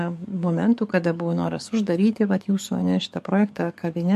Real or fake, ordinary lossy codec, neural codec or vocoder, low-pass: fake; MP3, 64 kbps; codec, 44.1 kHz, 7.8 kbps, DAC; 14.4 kHz